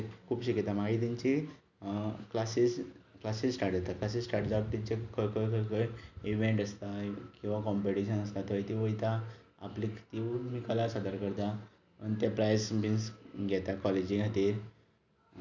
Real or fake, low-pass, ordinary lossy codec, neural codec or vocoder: real; 7.2 kHz; none; none